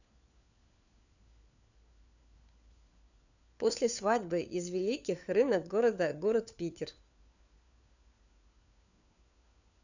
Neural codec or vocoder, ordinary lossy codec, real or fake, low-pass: codec, 16 kHz, 4 kbps, FunCodec, trained on LibriTTS, 50 frames a second; none; fake; 7.2 kHz